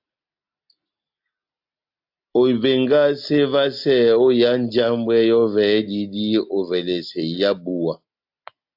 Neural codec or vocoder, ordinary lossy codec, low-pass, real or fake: none; AAC, 48 kbps; 5.4 kHz; real